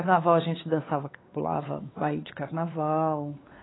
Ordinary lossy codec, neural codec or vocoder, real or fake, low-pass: AAC, 16 kbps; codec, 16 kHz, 4 kbps, X-Codec, WavLM features, trained on Multilingual LibriSpeech; fake; 7.2 kHz